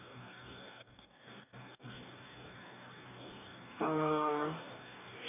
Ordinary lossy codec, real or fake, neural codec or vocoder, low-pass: none; fake; codec, 44.1 kHz, 2.6 kbps, DAC; 3.6 kHz